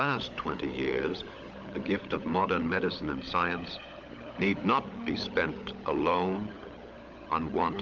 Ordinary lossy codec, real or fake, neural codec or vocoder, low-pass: Opus, 32 kbps; fake; codec, 16 kHz, 16 kbps, FunCodec, trained on LibriTTS, 50 frames a second; 7.2 kHz